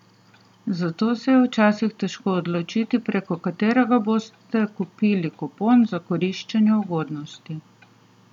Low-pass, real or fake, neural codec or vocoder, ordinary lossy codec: 19.8 kHz; real; none; none